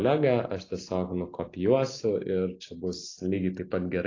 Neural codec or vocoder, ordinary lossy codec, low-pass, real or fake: none; AAC, 32 kbps; 7.2 kHz; real